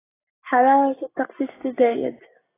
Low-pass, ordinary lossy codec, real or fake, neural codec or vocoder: 3.6 kHz; AAC, 16 kbps; real; none